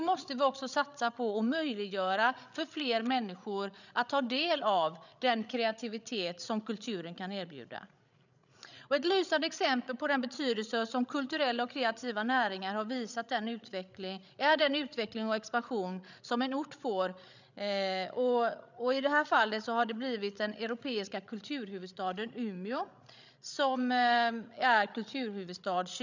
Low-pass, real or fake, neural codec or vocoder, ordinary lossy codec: 7.2 kHz; fake; codec, 16 kHz, 16 kbps, FreqCodec, larger model; none